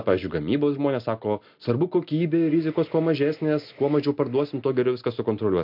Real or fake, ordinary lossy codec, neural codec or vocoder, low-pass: real; MP3, 48 kbps; none; 5.4 kHz